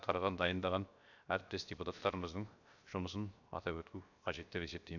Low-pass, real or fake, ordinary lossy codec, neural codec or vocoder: 7.2 kHz; fake; none; codec, 16 kHz, about 1 kbps, DyCAST, with the encoder's durations